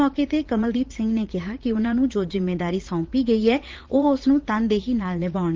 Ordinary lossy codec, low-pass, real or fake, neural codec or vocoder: Opus, 16 kbps; 7.2 kHz; fake; vocoder, 44.1 kHz, 80 mel bands, Vocos